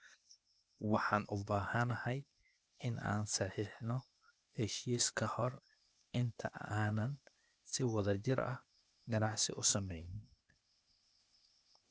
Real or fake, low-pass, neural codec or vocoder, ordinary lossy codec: fake; none; codec, 16 kHz, 0.8 kbps, ZipCodec; none